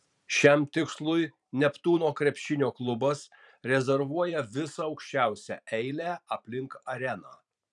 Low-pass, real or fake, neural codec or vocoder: 10.8 kHz; fake; vocoder, 44.1 kHz, 128 mel bands every 512 samples, BigVGAN v2